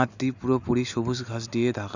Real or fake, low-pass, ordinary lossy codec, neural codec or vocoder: real; 7.2 kHz; none; none